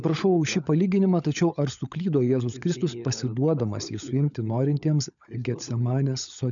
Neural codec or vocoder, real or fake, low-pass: codec, 16 kHz, 16 kbps, FunCodec, trained on LibriTTS, 50 frames a second; fake; 7.2 kHz